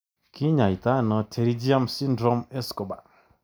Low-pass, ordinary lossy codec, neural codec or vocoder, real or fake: none; none; none; real